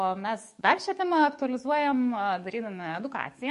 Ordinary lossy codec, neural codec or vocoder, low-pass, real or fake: MP3, 48 kbps; codec, 44.1 kHz, 7.8 kbps, DAC; 14.4 kHz; fake